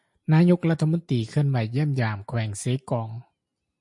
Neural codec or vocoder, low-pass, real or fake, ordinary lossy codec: none; 10.8 kHz; real; MP3, 48 kbps